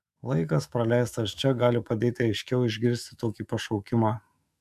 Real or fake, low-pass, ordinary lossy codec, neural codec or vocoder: fake; 14.4 kHz; MP3, 96 kbps; autoencoder, 48 kHz, 128 numbers a frame, DAC-VAE, trained on Japanese speech